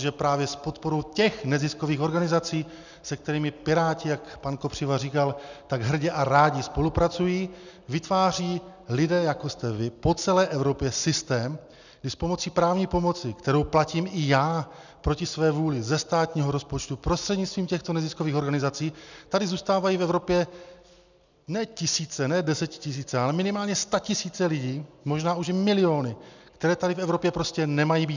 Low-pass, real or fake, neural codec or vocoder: 7.2 kHz; real; none